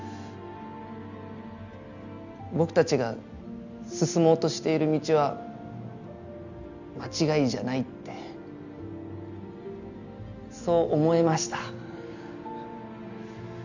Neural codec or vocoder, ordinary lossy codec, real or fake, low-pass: none; none; real; 7.2 kHz